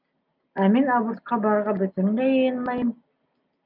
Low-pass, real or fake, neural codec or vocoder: 5.4 kHz; real; none